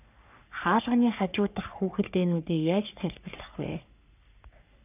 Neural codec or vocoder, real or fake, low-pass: codec, 44.1 kHz, 3.4 kbps, Pupu-Codec; fake; 3.6 kHz